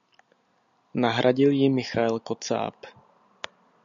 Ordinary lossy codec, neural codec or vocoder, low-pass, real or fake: MP3, 96 kbps; none; 7.2 kHz; real